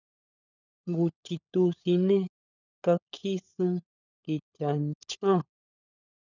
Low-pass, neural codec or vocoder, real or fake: 7.2 kHz; codec, 16 kHz, 16 kbps, FunCodec, trained on LibriTTS, 50 frames a second; fake